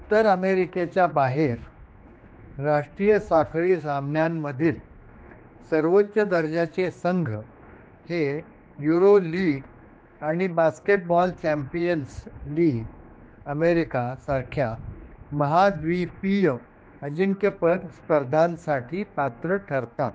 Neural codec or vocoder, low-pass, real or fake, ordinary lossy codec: codec, 16 kHz, 2 kbps, X-Codec, HuBERT features, trained on general audio; none; fake; none